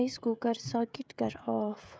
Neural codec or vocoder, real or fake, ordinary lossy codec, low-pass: codec, 16 kHz, 16 kbps, FreqCodec, smaller model; fake; none; none